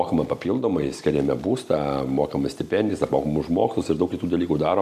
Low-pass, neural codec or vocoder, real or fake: 14.4 kHz; none; real